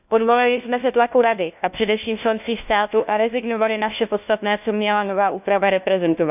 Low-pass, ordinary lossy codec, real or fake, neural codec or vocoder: 3.6 kHz; none; fake; codec, 16 kHz, 1 kbps, FunCodec, trained on LibriTTS, 50 frames a second